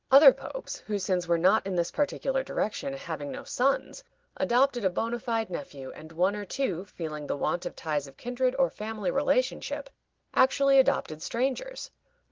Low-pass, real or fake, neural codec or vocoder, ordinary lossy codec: 7.2 kHz; real; none; Opus, 16 kbps